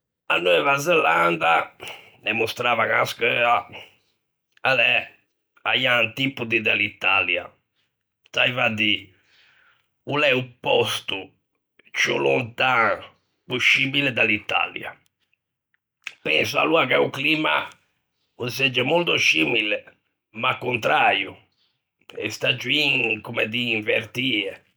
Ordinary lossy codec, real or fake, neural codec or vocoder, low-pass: none; real; none; none